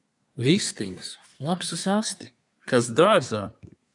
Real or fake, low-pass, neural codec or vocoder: fake; 10.8 kHz; codec, 24 kHz, 1 kbps, SNAC